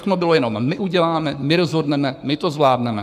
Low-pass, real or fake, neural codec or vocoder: 14.4 kHz; fake; codec, 44.1 kHz, 7.8 kbps, Pupu-Codec